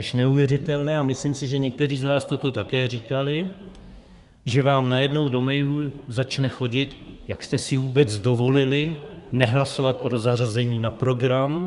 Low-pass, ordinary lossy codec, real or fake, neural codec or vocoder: 10.8 kHz; Opus, 64 kbps; fake; codec, 24 kHz, 1 kbps, SNAC